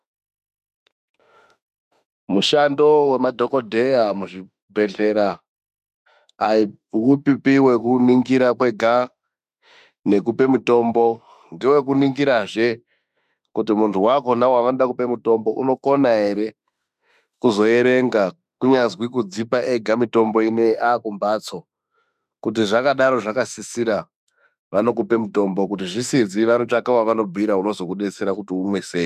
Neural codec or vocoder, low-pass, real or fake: autoencoder, 48 kHz, 32 numbers a frame, DAC-VAE, trained on Japanese speech; 14.4 kHz; fake